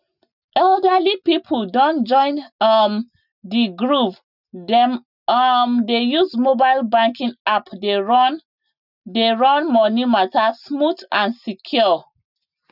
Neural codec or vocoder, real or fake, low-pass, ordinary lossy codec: none; real; 5.4 kHz; none